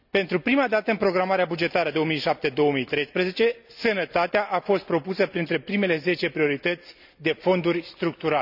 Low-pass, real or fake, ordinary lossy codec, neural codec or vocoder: 5.4 kHz; real; none; none